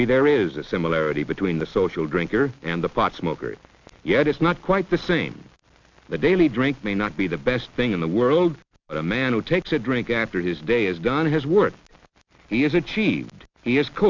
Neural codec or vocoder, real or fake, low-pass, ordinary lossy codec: none; real; 7.2 kHz; MP3, 64 kbps